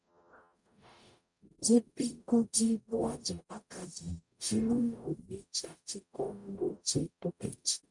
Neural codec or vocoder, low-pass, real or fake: codec, 44.1 kHz, 0.9 kbps, DAC; 10.8 kHz; fake